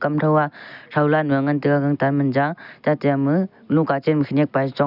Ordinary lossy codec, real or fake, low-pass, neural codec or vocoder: none; real; 5.4 kHz; none